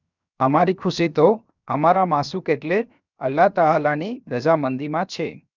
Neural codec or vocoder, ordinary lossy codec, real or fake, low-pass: codec, 16 kHz, 0.7 kbps, FocalCodec; none; fake; 7.2 kHz